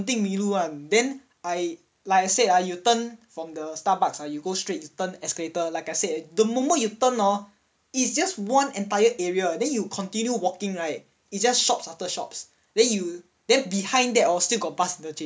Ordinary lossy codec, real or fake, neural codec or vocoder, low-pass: none; real; none; none